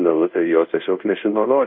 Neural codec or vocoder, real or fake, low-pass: codec, 24 kHz, 0.5 kbps, DualCodec; fake; 5.4 kHz